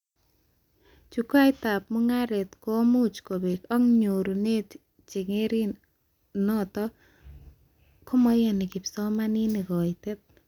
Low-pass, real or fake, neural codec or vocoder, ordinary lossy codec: 19.8 kHz; real; none; none